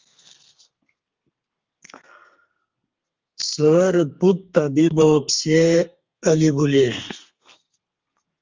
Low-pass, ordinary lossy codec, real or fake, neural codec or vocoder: 7.2 kHz; Opus, 32 kbps; fake; codec, 32 kHz, 1.9 kbps, SNAC